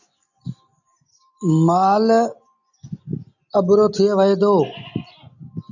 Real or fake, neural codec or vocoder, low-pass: real; none; 7.2 kHz